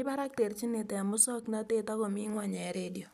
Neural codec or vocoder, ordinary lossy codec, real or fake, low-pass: none; none; real; 14.4 kHz